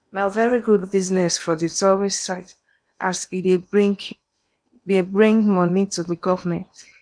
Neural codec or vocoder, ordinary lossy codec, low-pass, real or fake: codec, 16 kHz in and 24 kHz out, 0.8 kbps, FocalCodec, streaming, 65536 codes; none; 9.9 kHz; fake